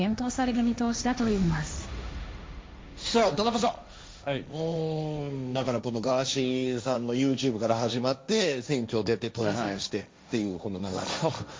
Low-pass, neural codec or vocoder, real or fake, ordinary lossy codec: none; codec, 16 kHz, 1.1 kbps, Voila-Tokenizer; fake; none